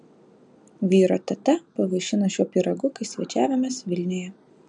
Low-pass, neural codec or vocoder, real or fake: 9.9 kHz; none; real